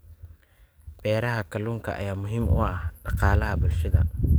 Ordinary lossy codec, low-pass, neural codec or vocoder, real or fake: none; none; codec, 44.1 kHz, 7.8 kbps, DAC; fake